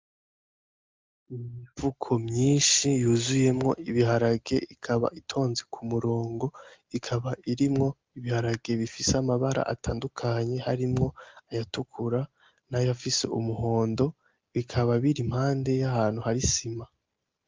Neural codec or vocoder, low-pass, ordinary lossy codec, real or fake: none; 7.2 kHz; Opus, 32 kbps; real